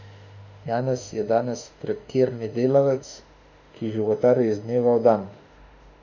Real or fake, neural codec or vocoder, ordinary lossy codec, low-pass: fake; autoencoder, 48 kHz, 32 numbers a frame, DAC-VAE, trained on Japanese speech; none; 7.2 kHz